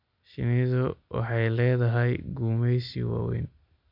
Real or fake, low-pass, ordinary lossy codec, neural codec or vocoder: real; 5.4 kHz; none; none